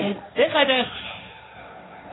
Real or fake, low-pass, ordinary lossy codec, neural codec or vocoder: fake; 7.2 kHz; AAC, 16 kbps; codec, 16 kHz, 1.1 kbps, Voila-Tokenizer